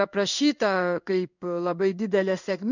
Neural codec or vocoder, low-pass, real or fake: codec, 16 kHz in and 24 kHz out, 1 kbps, XY-Tokenizer; 7.2 kHz; fake